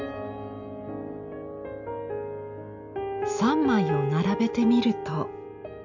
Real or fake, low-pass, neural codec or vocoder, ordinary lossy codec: real; 7.2 kHz; none; none